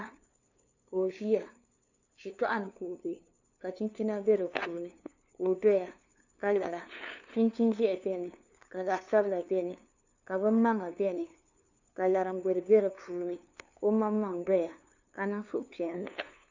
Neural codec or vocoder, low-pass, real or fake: codec, 16 kHz, 2 kbps, FunCodec, trained on LibriTTS, 25 frames a second; 7.2 kHz; fake